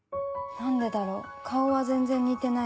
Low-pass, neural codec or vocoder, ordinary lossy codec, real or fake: none; none; none; real